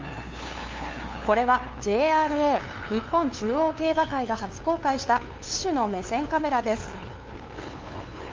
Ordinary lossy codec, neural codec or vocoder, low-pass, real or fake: Opus, 32 kbps; codec, 16 kHz, 2 kbps, FunCodec, trained on LibriTTS, 25 frames a second; 7.2 kHz; fake